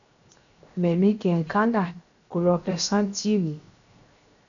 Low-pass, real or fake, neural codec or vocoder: 7.2 kHz; fake; codec, 16 kHz, 0.7 kbps, FocalCodec